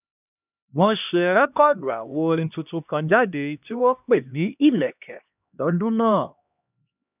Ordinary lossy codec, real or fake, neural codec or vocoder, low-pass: none; fake; codec, 16 kHz, 1 kbps, X-Codec, HuBERT features, trained on LibriSpeech; 3.6 kHz